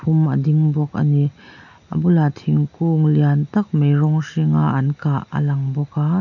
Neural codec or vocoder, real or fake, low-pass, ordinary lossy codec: none; real; 7.2 kHz; none